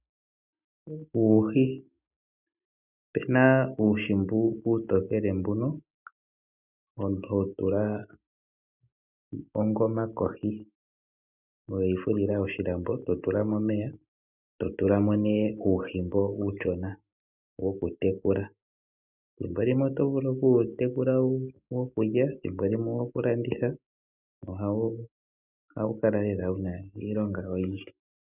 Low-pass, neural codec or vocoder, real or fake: 3.6 kHz; none; real